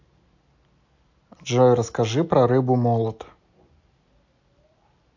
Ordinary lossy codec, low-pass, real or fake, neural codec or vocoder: AAC, 48 kbps; 7.2 kHz; real; none